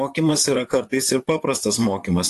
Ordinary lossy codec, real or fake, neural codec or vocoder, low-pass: AAC, 64 kbps; fake; vocoder, 44.1 kHz, 128 mel bands, Pupu-Vocoder; 14.4 kHz